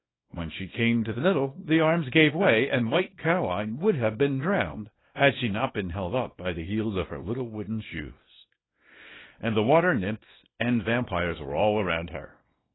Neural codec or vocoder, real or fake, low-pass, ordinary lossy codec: codec, 24 kHz, 0.9 kbps, WavTokenizer, small release; fake; 7.2 kHz; AAC, 16 kbps